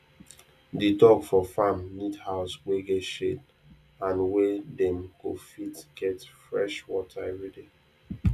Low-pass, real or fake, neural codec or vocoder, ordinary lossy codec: 14.4 kHz; real; none; none